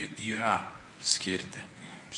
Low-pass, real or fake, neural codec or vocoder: 10.8 kHz; fake; codec, 24 kHz, 0.9 kbps, WavTokenizer, medium speech release version 1